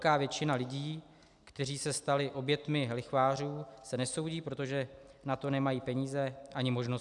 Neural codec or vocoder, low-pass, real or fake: none; 10.8 kHz; real